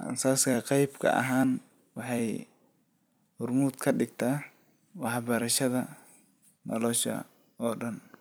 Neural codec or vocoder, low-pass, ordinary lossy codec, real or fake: vocoder, 44.1 kHz, 128 mel bands every 256 samples, BigVGAN v2; none; none; fake